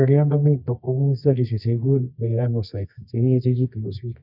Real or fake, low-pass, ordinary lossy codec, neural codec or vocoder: fake; 5.4 kHz; none; codec, 24 kHz, 0.9 kbps, WavTokenizer, medium music audio release